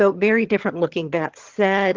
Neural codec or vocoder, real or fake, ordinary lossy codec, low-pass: vocoder, 22.05 kHz, 80 mel bands, HiFi-GAN; fake; Opus, 16 kbps; 7.2 kHz